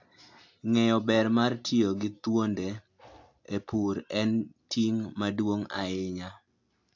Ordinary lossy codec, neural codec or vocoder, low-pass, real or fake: AAC, 48 kbps; none; 7.2 kHz; real